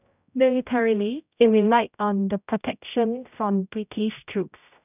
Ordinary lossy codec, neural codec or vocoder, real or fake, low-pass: none; codec, 16 kHz, 0.5 kbps, X-Codec, HuBERT features, trained on general audio; fake; 3.6 kHz